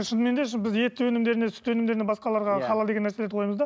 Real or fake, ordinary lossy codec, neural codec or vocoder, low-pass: real; none; none; none